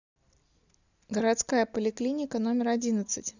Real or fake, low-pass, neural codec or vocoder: real; 7.2 kHz; none